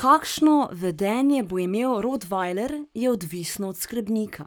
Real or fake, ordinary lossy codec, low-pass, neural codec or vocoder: fake; none; none; codec, 44.1 kHz, 7.8 kbps, Pupu-Codec